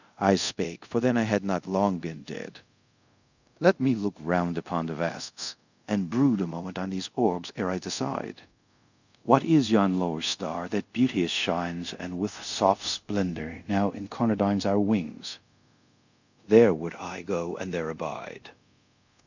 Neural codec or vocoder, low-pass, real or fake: codec, 24 kHz, 0.5 kbps, DualCodec; 7.2 kHz; fake